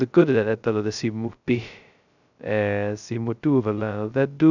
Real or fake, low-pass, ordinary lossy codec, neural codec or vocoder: fake; 7.2 kHz; none; codec, 16 kHz, 0.2 kbps, FocalCodec